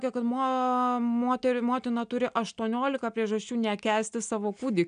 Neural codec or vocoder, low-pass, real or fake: none; 9.9 kHz; real